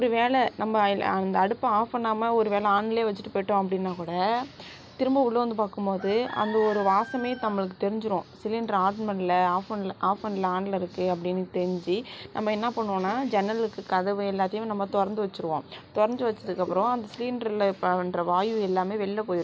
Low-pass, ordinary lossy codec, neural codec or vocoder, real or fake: none; none; none; real